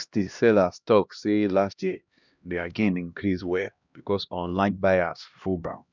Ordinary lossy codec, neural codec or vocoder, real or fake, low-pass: none; codec, 16 kHz, 1 kbps, X-Codec, HuBERT features, trained on LibriSpeech; fake; 7.2 kHz